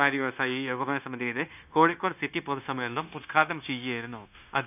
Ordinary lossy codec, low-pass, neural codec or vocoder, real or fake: none; 3.6 kHz; codec, 16 kHz, 0.9 kbps, LongCat-Audio-Codec; fake